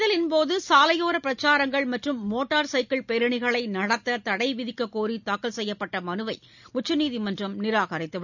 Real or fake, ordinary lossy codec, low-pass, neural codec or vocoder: real; none; 7.2 kHz; none